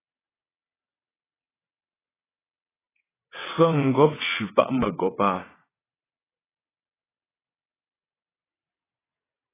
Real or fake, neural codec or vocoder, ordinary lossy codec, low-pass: fake; vocoder, 22.05 kHz, 80 mel bands, WaveNeXt; AAC, 16 kbps; 3.6 kHz